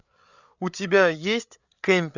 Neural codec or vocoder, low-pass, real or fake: codec, 16 kHz, 8 kbps, FreqCodec, larger model; 7.2 kHz; fake